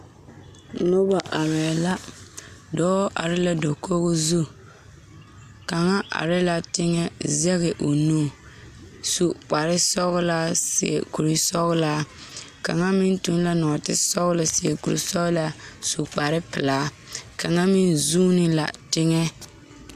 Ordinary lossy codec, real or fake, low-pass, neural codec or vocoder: AAC, 96 kbps; real; 14.4 kHz; none